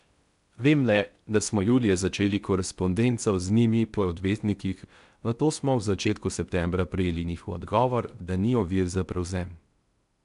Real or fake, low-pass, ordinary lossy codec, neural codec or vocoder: fake; 10.8 kHz; none; codec, 16 kHz in and 24 kHz out, 0.6 kbps, FocalCodec, streaming, 4096 codes